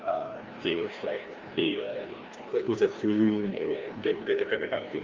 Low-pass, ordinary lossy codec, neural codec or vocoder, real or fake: 7.2 kHz; Opus, 32 kbps; codec, 16 kHz, 1 kbps, FreqCodec, larger model; fake